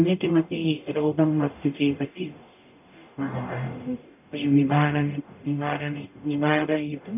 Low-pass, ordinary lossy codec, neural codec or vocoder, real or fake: 3.6 kHz; none; codec, 44.1 kHz, 0.9 kbps, DAC; fake